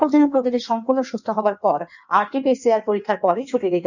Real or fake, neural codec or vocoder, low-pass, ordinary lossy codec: fake; codec, 16 kHz in and 24 kHz out, 1.1 kbps, FireRedTTS-2 codec; 7.2 kHz; none